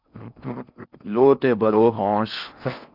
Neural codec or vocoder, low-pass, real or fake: codec, 16 kHz in and 24 kHz out, 0.6 kbps, FocalCodec, streaming, 4096 codes; 5.4 kHz; fake